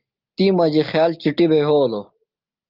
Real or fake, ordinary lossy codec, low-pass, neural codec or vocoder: real; Opus, 24 kbps; 5.4 kHz; none